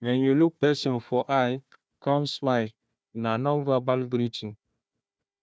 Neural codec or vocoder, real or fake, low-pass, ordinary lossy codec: codec, 16 kHz, 1 kbps, FunCodec, trained on Chinese and English, 50 frames a second; fake; none; none